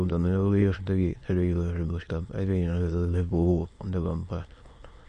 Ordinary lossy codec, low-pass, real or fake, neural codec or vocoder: MP3, 48 kbps; 9.9 kHz; fake; autoencoder, 22.05 kHz, a latent of 192 numbers a frame, VITS, trained on many speakers